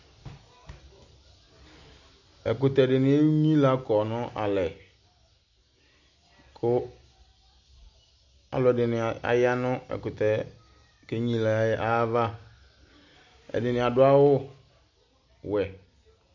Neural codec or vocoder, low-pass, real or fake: none; 7.2 kHz; real